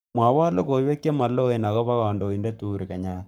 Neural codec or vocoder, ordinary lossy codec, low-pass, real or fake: codec, 44.1 kHz, 7.8 kbps, Pupu-Codec; none; none; fake